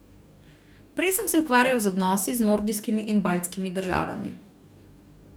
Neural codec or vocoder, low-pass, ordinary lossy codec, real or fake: codec, 44.1 kHz, 2.6 kbps, DAC; none; none; fake